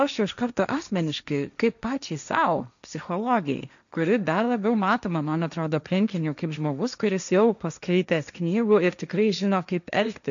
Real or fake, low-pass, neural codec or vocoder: fake; 7.2 kHz; codec, 16 kHz, 1.1 kbps, Voila-Tokenizer